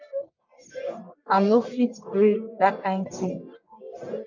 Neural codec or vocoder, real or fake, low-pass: codec, 44.1 kHz, 1.7 kbps, Pupu-Codec; fake; 7.2 kHz